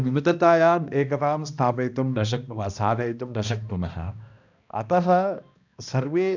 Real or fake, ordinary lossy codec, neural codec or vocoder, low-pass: fake; none; codec, 16 kHz, 1 kbps, X-Codec, HuBERT features, trained on balanced general audio; 7.2 kHz